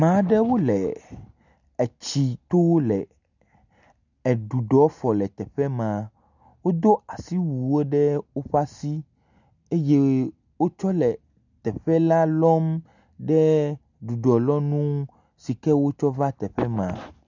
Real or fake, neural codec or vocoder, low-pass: real; none; 7.2 kHz